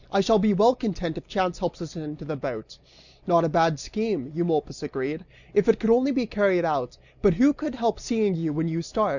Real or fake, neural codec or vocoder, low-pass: real; none; 7.2 kHz